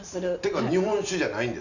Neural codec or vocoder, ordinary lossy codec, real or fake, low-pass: none; none; real; 7.2 kHz